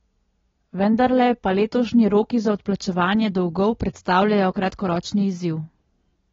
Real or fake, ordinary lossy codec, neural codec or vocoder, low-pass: real; AAC, 24 kbps; none; 7.2 kHz